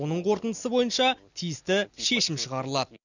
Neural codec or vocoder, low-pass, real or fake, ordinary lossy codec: none; 7.2 kHz; real; none